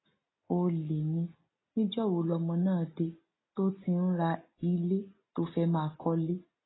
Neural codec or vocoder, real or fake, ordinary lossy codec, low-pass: none; real; AAC, 16 kbps; 7.2 kHz